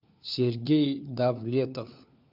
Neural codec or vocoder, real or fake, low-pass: codec, 16 kHz, 4 kbps, FunCodec, trained on LibriTTS, 50 frames a second; fake; 5.4 kHz